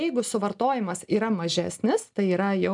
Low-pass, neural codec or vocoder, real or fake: 10.8 kHz; none; real